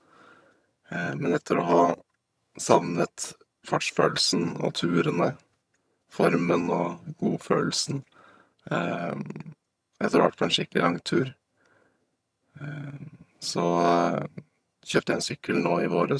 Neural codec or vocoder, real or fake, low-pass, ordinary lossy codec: vocoder, 22.05 kHz, 80 mel bands, HiFi-GAN; fake; none; none